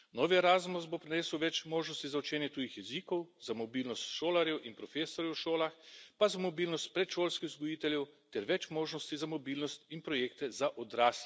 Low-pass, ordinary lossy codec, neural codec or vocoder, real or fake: none; none; none; real